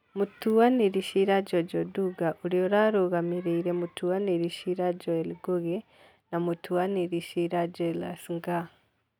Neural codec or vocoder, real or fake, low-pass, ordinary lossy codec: none; real; 19.8 kHz; none